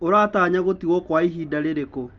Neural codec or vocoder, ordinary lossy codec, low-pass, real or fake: none; Opus, 24 kbps; 7.2 kHz; real